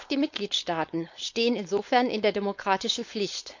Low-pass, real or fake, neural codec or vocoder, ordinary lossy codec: 7.2 kHz; fake; codec, 16 kHz, 4.8 kbps, FACodec; none